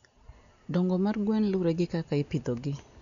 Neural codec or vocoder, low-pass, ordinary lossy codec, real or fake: none; 7.2 kHz; none; real